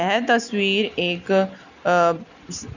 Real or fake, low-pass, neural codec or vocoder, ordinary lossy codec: real; 7.2 kHz; none; none